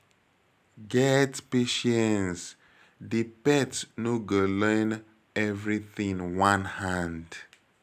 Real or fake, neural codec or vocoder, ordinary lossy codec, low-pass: real; none; none; 14.4 kHz